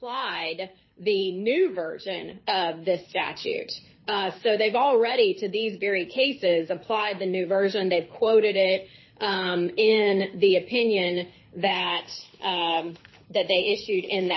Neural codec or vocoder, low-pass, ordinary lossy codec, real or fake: codec, 24 kHz, 6 kbps, HILCodec; 7.2 kHz; MP3, 24 kbps; fake